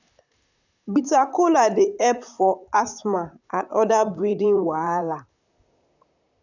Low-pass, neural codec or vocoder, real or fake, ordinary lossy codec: 7.2 kHz; vocoder, 44.1 kHz, 128 mel bands, Pupu-Vocoder; fake; none